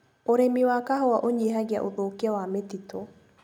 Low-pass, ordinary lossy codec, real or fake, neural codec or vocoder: 19.8 kHz; none; real; none